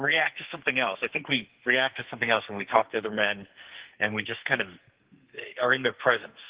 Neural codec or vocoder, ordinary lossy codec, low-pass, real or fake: codec, 44.1 kHz, 2.6 kbps, SNAC; Opus, 16 kbps; 3.6 kHz; fake